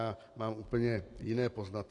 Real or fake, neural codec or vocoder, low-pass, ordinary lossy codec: real; none; 10.8 kHz; AAC, 48 kbps